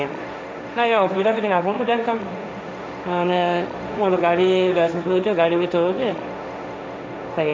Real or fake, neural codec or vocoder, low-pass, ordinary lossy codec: fake; codec, 16 kHz, 1.1 kbps, Voila-Tokenizer; none; none